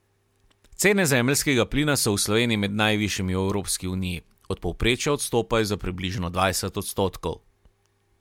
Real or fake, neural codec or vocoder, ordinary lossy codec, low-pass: real; none; MP3, 96 kbps; 19.8 kHz